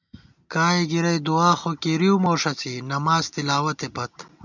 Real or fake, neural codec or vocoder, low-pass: real; none; 7.2 kHz